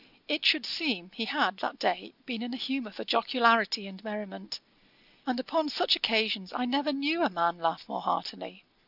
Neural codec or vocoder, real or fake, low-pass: none; real; 5.4 kHz